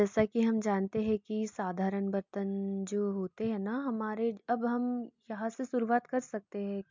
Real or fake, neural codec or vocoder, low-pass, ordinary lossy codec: real; none; 7.2 kHz; MP3, 64 kbps